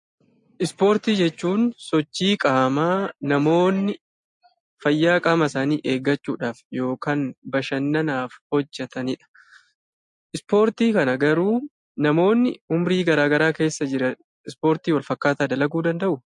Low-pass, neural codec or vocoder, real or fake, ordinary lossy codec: 10.8 kHz; none; real; MP3, 48 kbps